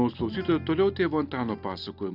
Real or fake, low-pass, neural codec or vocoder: real; 5.4 kHz; none